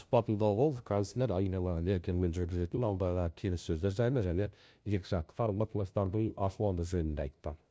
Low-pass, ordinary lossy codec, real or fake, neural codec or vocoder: none; none; fake; codec, 16 kHz, 0.5 kbps, FunCodec, trained on LibriTTS, 25 frames a second